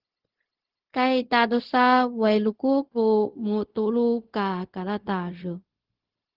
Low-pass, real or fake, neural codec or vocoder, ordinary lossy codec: 5.4 kHz; fake; codec, 16 kHz, 0.4 kbps, LongCat-Audio-Codec; Opus, 16 kbps